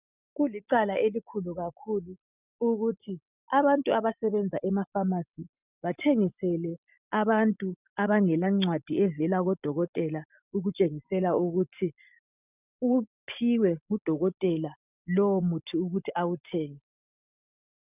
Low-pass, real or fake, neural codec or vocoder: 3.6 kHz; real; none